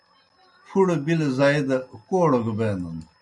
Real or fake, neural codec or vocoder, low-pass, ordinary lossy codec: real; none; 10.8 kHz; MP3, 96 kbps